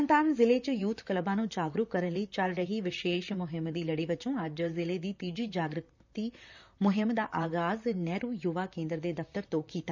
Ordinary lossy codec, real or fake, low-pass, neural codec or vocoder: none; fake; 7.2 kHz; vocoder, 44.1 kHz, 128 mel bands, Pupu-Vocoder